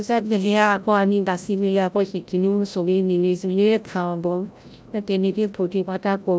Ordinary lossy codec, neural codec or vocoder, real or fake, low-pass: none; codec, 16 kHz, 0.5 kbps, FreqCodec, larger model; fake; none